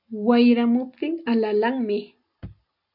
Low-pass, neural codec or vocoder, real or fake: 5.4 kHz; none; real